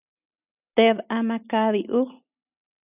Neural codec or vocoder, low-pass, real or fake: none; 3.6 kHz; real